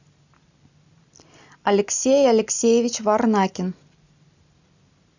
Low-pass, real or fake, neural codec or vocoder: 7.2 kHz; real; none